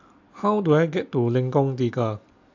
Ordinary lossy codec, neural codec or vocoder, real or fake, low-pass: AAC, 48 kbps; none; real; 7.2 kHz